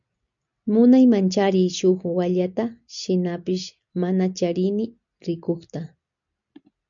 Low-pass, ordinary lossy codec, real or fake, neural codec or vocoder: 7.2 kHz; MP3, 96 kbps; real; none